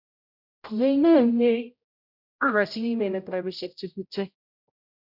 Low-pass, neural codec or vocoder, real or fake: 5.4 kHz; codec, 16 kHz, 0.5 kbps, X-Codec, HuBERT features, trained on general audio; fake